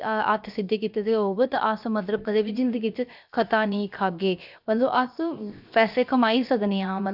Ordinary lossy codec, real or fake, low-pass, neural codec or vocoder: none; fake; 5.4 kHz; codec, 16 kHz, 0.7 kbps, FocalCodec